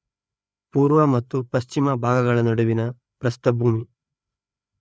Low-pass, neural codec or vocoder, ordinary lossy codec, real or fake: none; codec, 16 kHz, 4 kbps, FreqCodec, larger model; none; fake